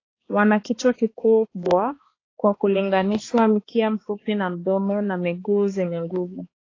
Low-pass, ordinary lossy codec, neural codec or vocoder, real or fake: 7.2 kHz; AAC, 32 kbps; codec, 16 kHz, 2 kbps, X-Codec, HuBERT features, trained on balanced general audio; fake